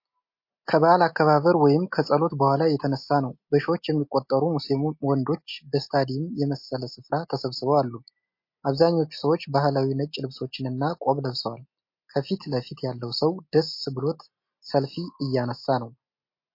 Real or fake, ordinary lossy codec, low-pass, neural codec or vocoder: real; MP3, 32 kbps; 5.4 kHz; none